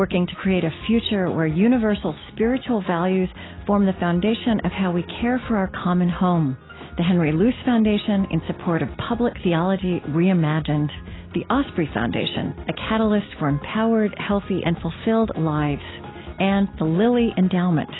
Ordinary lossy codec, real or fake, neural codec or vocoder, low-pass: AAC, 16 kbps; real; none; 7.2 kHz